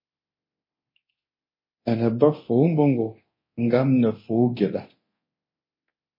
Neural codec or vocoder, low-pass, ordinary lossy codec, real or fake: codec, 24 kHz, 0.9 kbps, DualCodec; 5.4 kHz; MP3, 24 kbps; fake